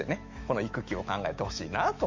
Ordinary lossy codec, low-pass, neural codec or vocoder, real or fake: MP3, 48 kbps; 7.2 kHz; autoencoder, 48 kHz, 128 numbers a frame, DAC-VAE, trained on Japanese speech; fake